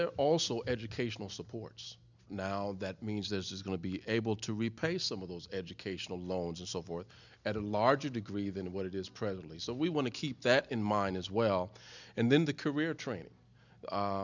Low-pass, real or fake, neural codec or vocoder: 7.2 kHz; real; none